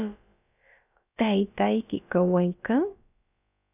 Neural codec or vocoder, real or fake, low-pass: codec, 16 kHz, about 1 kbps, DyCAST, with the encoder's durations; fake; 3.6 kHz